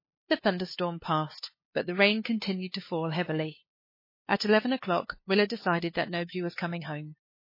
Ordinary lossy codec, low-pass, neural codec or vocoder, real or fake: MP3, 24 kbps; 5.4 kHz; codec, 16 kHz, 2 kbps, FunCodec, trained on LibriTTS, 25 frames a second; fake